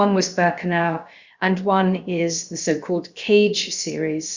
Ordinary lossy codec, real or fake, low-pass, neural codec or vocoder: Opus, 64 kbps; fake; 7.2 kHz; codec, 16 kHz, about 1 kbps, DyCAST, with the encoder's durations